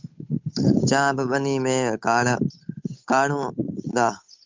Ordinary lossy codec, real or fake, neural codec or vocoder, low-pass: MP3, 64 kbps; fake; codec, 16 kHz, 8 kbps, FunCodec, trained on Chinese and English, 25 frames a second; 7.2 kHz